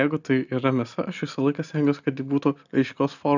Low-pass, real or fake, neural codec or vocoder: 7.2 kHz; real; none